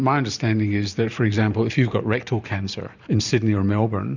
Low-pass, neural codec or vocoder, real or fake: 7.2 kHz; vocoder, 44.1 kHz, 128 mel bands every 512 samples, BigVGAN v2; fake